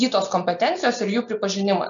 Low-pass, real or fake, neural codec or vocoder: 7.2 kHz; real; none